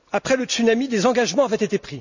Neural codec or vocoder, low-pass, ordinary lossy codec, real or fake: none; 7.2 kHz; none; real